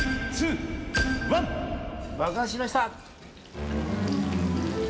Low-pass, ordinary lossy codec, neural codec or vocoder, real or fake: none; none; none; real